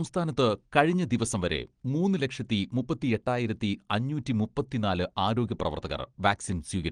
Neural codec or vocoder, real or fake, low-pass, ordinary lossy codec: none; real; 9.9 kHz; Opus, 24 kbps